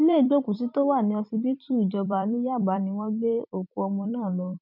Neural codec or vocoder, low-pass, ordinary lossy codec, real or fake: vocoder, 44.1 kHz, 128 mel bands every 256 samples, BigVGAN v2; 5.4 kHz; none; fake